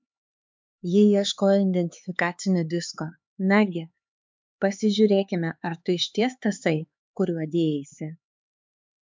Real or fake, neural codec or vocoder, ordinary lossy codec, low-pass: fake; codec, 16 kHz, 4 kbps, X-Codec, HuBERT features, trained on LibriSpeech; MP3, 64 kbps; 7.2 kHz